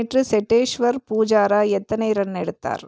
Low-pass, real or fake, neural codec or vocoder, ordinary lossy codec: none; real; none; none